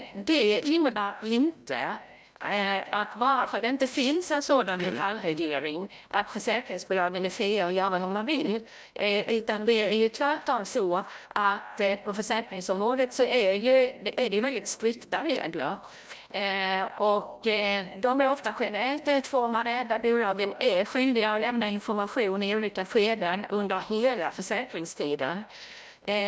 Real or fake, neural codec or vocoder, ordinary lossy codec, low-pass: fake; codec, 16 kHz, 0.5 kbps, FreqCodec, larger model; none; none